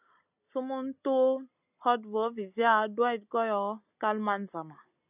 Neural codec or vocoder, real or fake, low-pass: none; real; 3.6 kHz